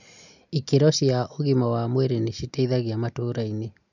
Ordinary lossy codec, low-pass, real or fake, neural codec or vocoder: none; 7.2 kHz; real; none